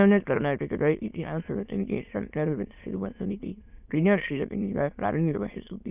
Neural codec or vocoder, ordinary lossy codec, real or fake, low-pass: autoencoder, 22.05 kHz, a latent of 192 numbers a frame, VITS, trained on many speakers; none; fake; 3.6 kHz